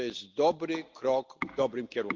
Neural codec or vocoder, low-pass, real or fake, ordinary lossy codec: none; 7.2 kHz; real; Opus, 32 kbps